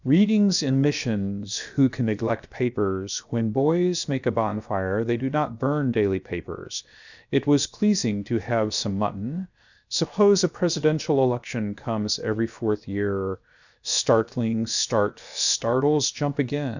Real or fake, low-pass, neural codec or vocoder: fake; 7.2 kHz; codec, 16 kHz, 0.7 kbps, FocalCodec